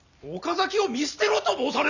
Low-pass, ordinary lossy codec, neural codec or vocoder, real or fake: 7.2 kHz; none; none; real